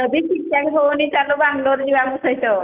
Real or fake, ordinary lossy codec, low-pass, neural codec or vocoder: real; Opus, 32 kbps; 3.6 kHz; none